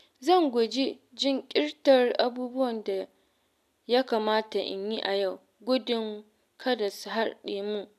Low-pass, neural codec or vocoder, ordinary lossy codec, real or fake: 14.4 kHz; none; none; real